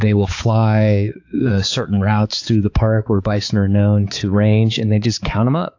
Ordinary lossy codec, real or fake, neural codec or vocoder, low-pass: AAC, 48 kbps; fake; codec, 16 kHz, 4 kbps, X-Codec, HuBERT features, trained on balanced general audio; 7.2 kHz